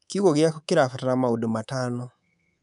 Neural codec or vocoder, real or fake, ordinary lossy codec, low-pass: codec, 24 kHz, 3.1 kbps, DualCodec; fake; none; 10.8 kHz